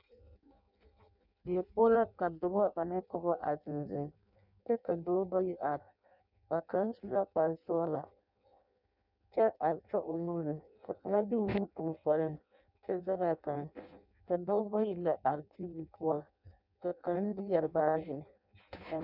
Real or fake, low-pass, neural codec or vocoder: fake; 5.4 kHz; codec, 16 kHz in and 24 kHz out, 0.6 kbps, FireRedTTS-2 codec